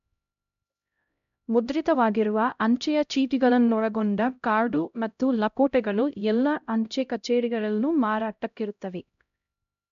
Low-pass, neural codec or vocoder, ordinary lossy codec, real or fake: 7.2 kHz; codec, 16 kHz, 0.5 kbps, X-Codec, HuBERT features, trained on LibriSpeech; MP3, 64 kbps; fake